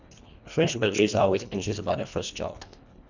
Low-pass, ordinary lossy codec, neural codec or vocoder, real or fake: 7.2 kHz; none; codec, 24 kHz, 1.5 kbps, HILCodec; fake